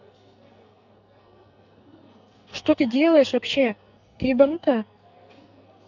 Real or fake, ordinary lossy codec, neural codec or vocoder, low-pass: fake; none; codec, 44.1 kHz, 2.6 kbps, SNAC; 7.2 kHz